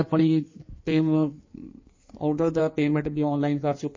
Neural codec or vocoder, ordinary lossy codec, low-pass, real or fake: codec, 16 kHz in and 24 kHz out, 1.1 kbps, FireRedTTS-2 codec; MP3, 32 kbps; 7.2 kHz; fake